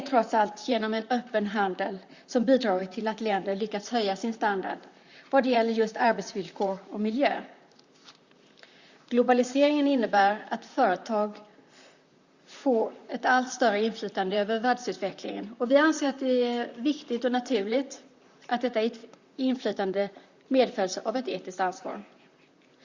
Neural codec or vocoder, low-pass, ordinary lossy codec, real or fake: vocoder, 44.1 kHz, 128 mel bands, Pupu-Vocoder; 7.2 kHz; Opus, 64 kbps; fake